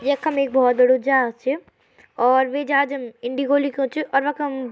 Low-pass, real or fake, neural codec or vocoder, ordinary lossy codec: none; real; none; none